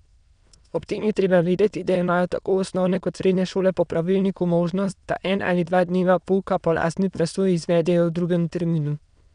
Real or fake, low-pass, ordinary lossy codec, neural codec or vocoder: fake; 9.9 kHz; none; autoencoder, 22.05 kHz, a latent of 192 numbers a frame, VITS, trained on many speakers